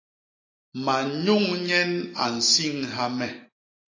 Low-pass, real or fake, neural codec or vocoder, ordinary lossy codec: 7.2 kHz; real; none; AAC, 32 kbps